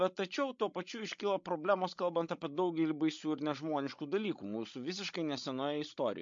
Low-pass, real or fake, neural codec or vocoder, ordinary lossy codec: 7.2 kHz; fake; codec, 16 kHz, 16 kbps, FreqCodec, larger model; MP3, 48 kbps